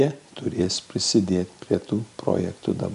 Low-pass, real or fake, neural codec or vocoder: 10.8 kHz; real; none